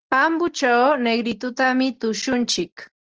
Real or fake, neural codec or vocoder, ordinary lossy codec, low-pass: real; none; Opus, 16 kbps; 7.2 kHz